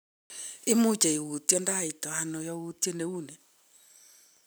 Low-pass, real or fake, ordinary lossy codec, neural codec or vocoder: none; real; none; none